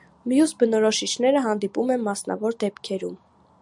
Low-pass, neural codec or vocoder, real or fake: 10.8 kHz; none; real